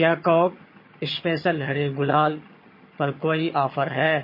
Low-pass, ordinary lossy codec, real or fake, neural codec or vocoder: 5.4 kHz; MP3, 24 kbps; fake; vocoder, 22.05 kHz, 80 mel bands, HiFi-GAN